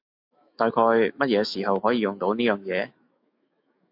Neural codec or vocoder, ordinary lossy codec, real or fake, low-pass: autoencoder, 48 kHz, 128 numbers a frame, DAC-VAE, trained on Japanese speech; AAC, 48 kbps; fake; 5.4 kHz